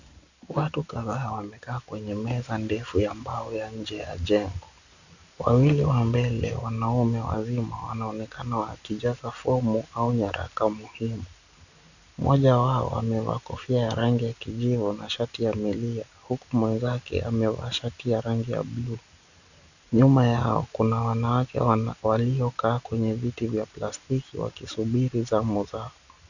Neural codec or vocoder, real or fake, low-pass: none; real; 7.2 kHz